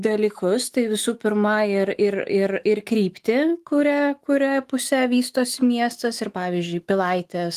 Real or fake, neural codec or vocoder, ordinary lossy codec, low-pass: fake; autoencoder, 48 kHz, 128 numbers a frame, DAC-VAE, trained on Japanese speech; Opus, 24 kbps; 14.4 kHz